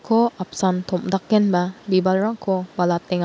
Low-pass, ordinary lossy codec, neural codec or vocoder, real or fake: none; none; none; real